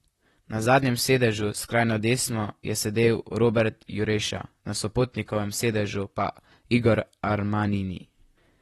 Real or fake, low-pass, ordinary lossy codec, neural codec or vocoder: fake; 19.8 kHz; AAC, 32 kbps; vocoder, 44.1 kHz, 128 mel bands, Pupu-Vocoder